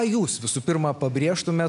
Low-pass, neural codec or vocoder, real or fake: 10.8 kHz; none; real